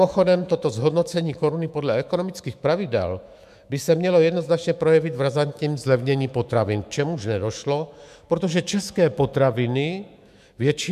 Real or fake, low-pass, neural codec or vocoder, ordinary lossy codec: fake; 14.4 kHz; autoencoder, 48 kHz, 128 numbers a frame, DAC-VAE, trained on Japanese speech; MP3, 96 kbps